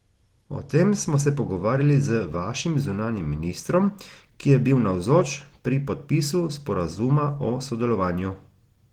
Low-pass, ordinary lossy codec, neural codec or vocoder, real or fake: 19.8 kHz; Opus, 16 kbps; none; real